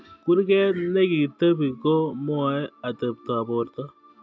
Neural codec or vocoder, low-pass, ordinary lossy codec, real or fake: none; none; none; real